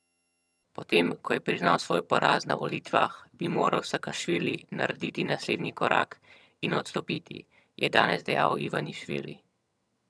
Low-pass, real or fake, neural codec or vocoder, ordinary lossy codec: none; fake; vocoder, 22.05 kHz, 80 mel bands, HiFi-GAN; none